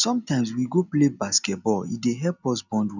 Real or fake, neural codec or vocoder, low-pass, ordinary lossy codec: real; none; 7.2 kHz; none